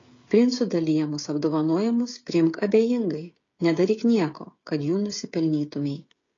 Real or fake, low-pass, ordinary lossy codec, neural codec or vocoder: fake; 7.2 kHz; AAC, 32 kbps; codec, 16 kHz, 16 kbps, FreqCodec, smaller model